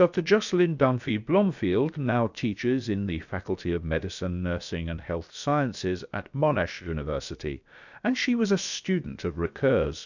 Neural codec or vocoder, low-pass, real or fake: codec, 16 kHz, about 1 kbps, DyCAST, with the encoder's durations; 7.2 kHz; fake